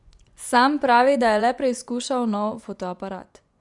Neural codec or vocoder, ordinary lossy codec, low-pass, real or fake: vocoder, 24 kHz, 100 mel bands, Vocos; none; 10.8 kHz; fake